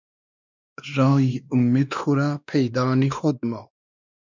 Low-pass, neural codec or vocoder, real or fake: 7.2 kHz; codec, 16 kHz, 2 kbps, X-Codec, WavLM features, trained on Multilingual LibriSpeech; fake